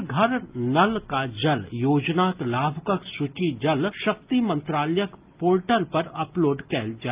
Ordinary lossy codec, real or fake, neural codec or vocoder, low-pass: Opus, 24 kbps; real; none; 3.6 kHz